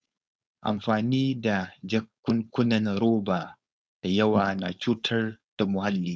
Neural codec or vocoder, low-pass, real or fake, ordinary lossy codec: codec, 16 kHz, 4.8 kbps, FACodec; none; fake; none